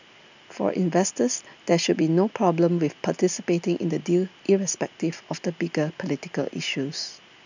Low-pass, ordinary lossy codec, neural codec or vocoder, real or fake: 7.2 kHz; none; none; real